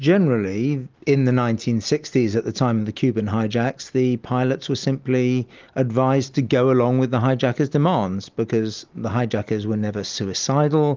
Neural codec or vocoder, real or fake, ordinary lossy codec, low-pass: none; real; Opus, 24 kbps; 7.2 kHz